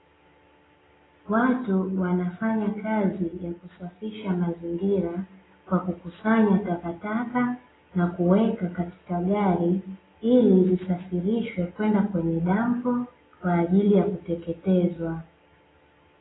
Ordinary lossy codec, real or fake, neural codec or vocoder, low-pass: AAC, 16 kbps; real; none; 7.2 kHz